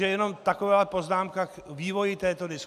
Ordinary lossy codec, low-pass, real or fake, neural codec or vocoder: AAC, 96 kbps; 14.4 kHz; real; none